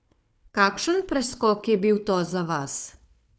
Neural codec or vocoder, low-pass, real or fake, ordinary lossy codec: codec, 16 kHz, 4 kbps, FunCodec, trained on Chinese and English, 50 frames a second; none; fake; none